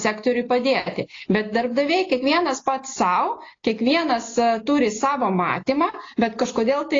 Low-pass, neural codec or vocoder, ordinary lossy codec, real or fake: 7.2 kHz; none; AAC, 32 kbps; real